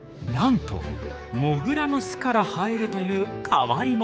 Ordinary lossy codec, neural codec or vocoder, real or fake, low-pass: none; codec, 16 kHz, 4 kbps, X-Codec, HuBERT features, trained on general audio; fake; none